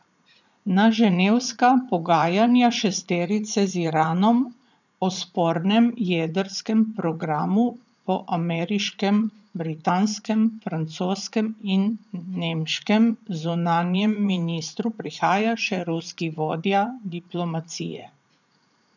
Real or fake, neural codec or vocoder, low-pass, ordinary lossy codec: fake; vocoder, 44.1 kHz, 80 mel bands, Vocos; 7.2 kHz; none